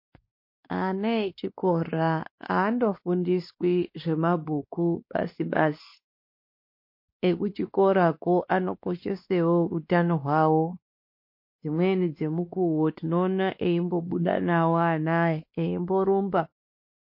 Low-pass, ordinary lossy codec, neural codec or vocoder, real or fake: 5.4 kHz; MP3, 32 kbps; codec, 16 kHz, 2 kbps, X-Codec, WavLM features, trained on Multilingual LibriSpeech; fake